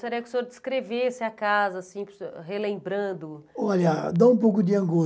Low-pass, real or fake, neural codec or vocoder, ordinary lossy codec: none; real; none; none